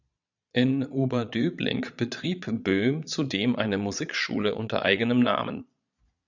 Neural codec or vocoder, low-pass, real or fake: vocoder, 22.05 kHz, 80 mel bands, Vocos; 7.2 kHz; fake